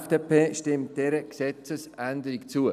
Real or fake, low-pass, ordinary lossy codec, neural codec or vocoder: real; 14.4 kHz; none; none